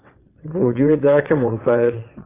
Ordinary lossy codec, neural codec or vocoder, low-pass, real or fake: AAC, 24 kbps; vocoder, 22.05 kHz, 80 mel bands, WaveNeXt; 3.6 kHz; fake